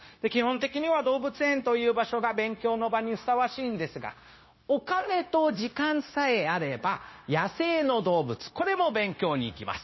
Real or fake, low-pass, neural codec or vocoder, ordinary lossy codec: fake; 7.2 kHz; codec, 16 kHz, 0.9 kbps, LongCat-Audio-Codec; MP3, 24 kbps